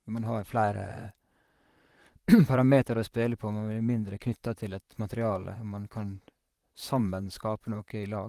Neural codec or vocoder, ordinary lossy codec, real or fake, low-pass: vocoder, 44.1 kHz, 128 mel bands, Pupu-Vocoder; Opus, 24 kbps; fake; 14.4 kHz